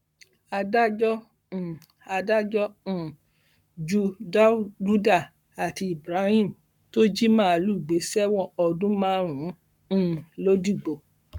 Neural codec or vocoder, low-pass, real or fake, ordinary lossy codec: codec, 44.1 kHz, 7.8 kbps, Pupu-Codec; 19.8 kHz; fake; none